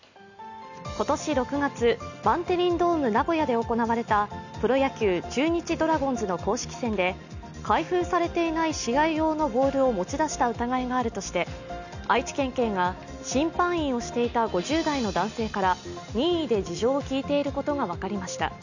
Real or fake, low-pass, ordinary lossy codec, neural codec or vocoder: real; 7.2 kHz; none; none